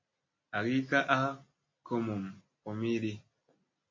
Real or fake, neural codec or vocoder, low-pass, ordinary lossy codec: real; none; 7.2 kHz; MP3, 32 kbps